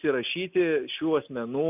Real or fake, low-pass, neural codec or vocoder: real; 3.6 kHz; none